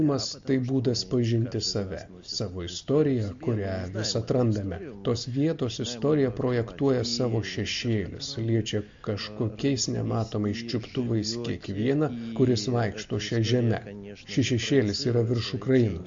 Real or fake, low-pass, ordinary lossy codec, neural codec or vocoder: real; 7.2 kHz; MP3, 48 kbps; none